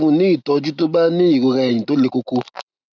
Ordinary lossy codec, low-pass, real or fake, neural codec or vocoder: none; 7.2 kHz; real; none